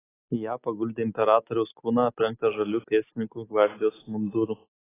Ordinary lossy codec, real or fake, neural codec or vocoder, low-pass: AAC, 16 kbps; real; none; 3.6 kHz